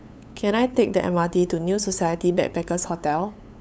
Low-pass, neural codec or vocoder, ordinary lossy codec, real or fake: none; codec, 16 kHz, 8 kbps, FunCodec, trained on LibriTTS, 25 frames a second; none; fake